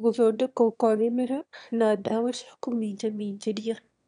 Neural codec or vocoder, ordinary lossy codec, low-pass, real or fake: autoencoder, 22.05 kHz, a latent of 192 numbers a frame, VITS, trained on one speaker; none; 9.9 kHz; fake